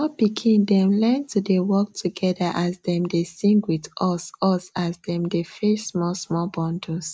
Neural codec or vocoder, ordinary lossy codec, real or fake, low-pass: none; none; real; none